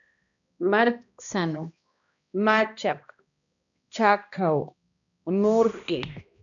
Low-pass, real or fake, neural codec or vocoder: 7.2 kHz; fake; codec, 16 kHz, 1 kbps, X-Codec, HuBERT features, trained on balanced general audio